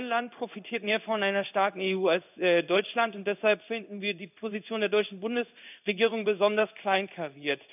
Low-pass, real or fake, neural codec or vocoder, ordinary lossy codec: 3.6 kHz; fake; codec, 16 kHz in and 24 kHz out, 1 kbps, XY-Tokenizer; none